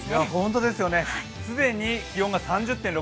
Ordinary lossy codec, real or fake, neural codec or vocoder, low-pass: none; real; none; none